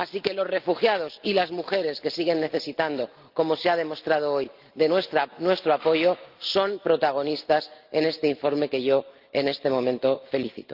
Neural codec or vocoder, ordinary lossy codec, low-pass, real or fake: none; Opus, 32 kbps; 5.4 kHz; real